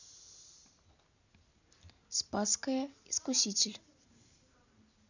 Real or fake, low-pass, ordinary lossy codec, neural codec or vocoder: real; 7.2 kHz; none; none